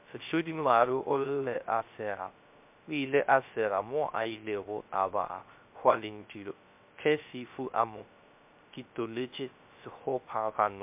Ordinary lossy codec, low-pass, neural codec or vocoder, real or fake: MP3, 32 kbps; 3.6 kHz; codec, 16 kHz, 0.3 kbps, FocalCodec; fake